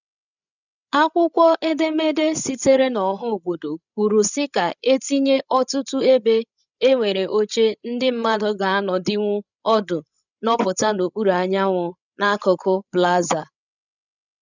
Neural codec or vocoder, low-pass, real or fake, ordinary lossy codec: codec, 16 kHz, 16 kbps, FreqCodec, larger model; 7.2 kHz; fake; none